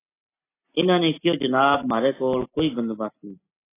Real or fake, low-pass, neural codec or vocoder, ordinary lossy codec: real; 3.6 kHz; none; AAC, 16 kbps